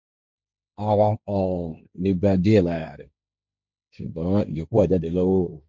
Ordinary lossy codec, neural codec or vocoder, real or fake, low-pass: none; codec, 16 kHz, 1.1 kbps, Voila-Tokenizer; fake; none